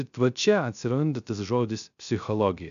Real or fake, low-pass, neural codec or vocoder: fake; 7.2 kHz; codec, 16 kHz, 0.3 kbps, FocalCodec